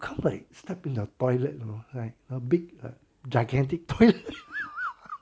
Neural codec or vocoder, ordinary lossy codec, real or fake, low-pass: none; none; real; none